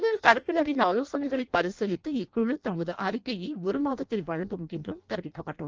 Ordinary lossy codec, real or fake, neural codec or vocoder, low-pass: Opus, 24 kbps; fake; codec, 16 kHz in and 24 kHz out, 0.6 kbps, FireRedTTS-2 codec; 7.2 kHz